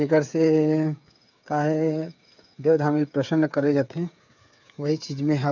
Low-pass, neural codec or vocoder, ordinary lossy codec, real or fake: 7.2 kHz; codec, 16 kHz, 8 kbps, FreqCodec, smaller model; none; fake